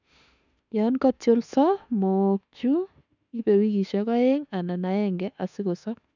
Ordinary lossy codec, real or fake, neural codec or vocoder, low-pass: none; fake; autoencoder, 48 kHz, 32 numbers a frame, DAC-VAE, trained on Japanese speech; 7.2 kHz